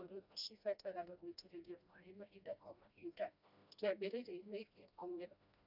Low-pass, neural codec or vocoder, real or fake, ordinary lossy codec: 5.4 kHz; codec, 16 kHz, 1 kbps, FreqCodec, smaller model; fake; none